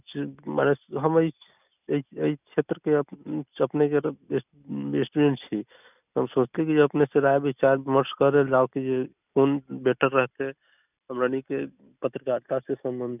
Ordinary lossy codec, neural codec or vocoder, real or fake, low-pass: none; none; real; 3.6 kHz